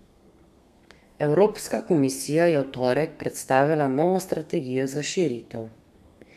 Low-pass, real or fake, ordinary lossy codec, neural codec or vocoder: 14.4 kHz; fake; none; codec, 32 kHz, 1.9 kbps, SNAC